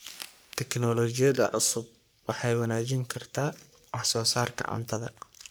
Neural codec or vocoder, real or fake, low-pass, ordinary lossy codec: codec, 44.1 kHz, 3.4 kbps, Pupu-Codec; fake; none; none